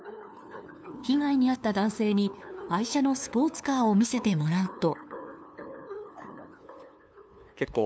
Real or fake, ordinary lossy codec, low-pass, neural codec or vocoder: fake; none; none; codec, 16 kHz, 2 kbps, FunCodec, trained on LibriTTS, 25 frames a second